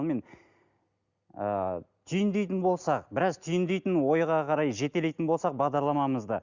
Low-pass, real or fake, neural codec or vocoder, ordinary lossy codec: 7.2 kHz; real; none; Opus, 64 kbps